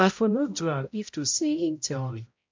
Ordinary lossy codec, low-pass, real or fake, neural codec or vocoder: MP3, 48 kbps; 7.2 kHz; fake; codec, 16 kHz, 0.5 kbps, X-Codec, HuBERT features, trained on balanced general audio